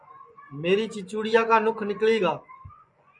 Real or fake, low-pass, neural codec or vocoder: fake; 10.8 kHz; vocoder, 24 kHz, 100 mel bands, Vocos